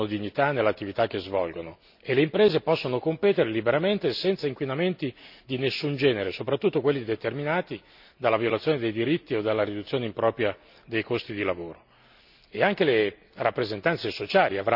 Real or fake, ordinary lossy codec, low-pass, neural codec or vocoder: real; none; 5.4 kHz; none